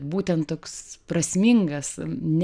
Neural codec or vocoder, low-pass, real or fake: none; 9.9 kHz; real